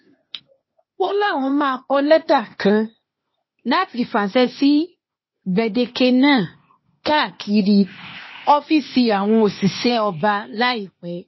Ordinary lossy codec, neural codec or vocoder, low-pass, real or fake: MP3, 24 kbps; codec, 16 kHz, 0.8 kbps, ZipCodec; 7.2 kHz; fake